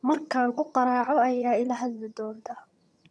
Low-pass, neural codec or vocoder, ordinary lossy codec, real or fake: none; vocoder, 22.05 kHz, 80 mel bands, HiFi-GAN; none; fake